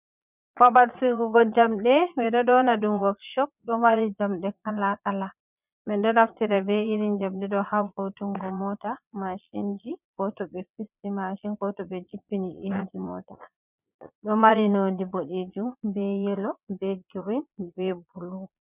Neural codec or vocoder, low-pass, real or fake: vocoder, 22.05 kHz, 80 mel bands, Vocos; 3.6 kHz; fake